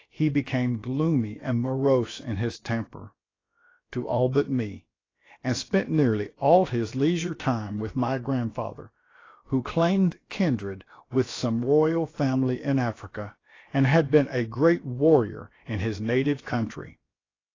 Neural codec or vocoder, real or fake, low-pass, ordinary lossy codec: codec, 16 kHz, about 1 kbps, DyCAST, with the encoder's durations; fake; 7.2 kHz; AAC, 32 kbps